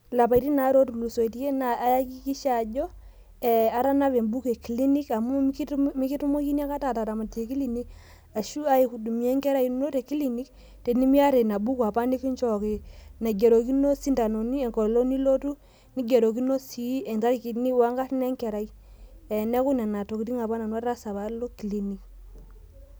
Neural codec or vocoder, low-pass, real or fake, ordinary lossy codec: none; none; real; none